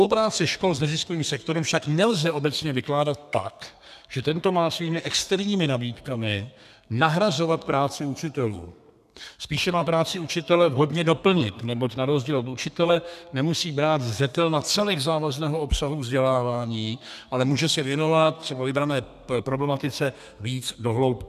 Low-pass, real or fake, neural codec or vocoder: 14.4 kHz; fake; codec, 32 kHz, 1.9 kbps, SNAC